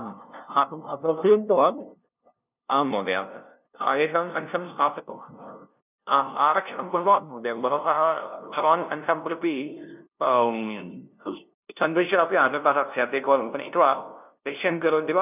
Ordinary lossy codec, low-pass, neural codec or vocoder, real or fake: none; 3.6 kHz; codec, 16 kHz, 0.5 kbps, FunCodec, trained on LibriTTS, 25 frames a second; fake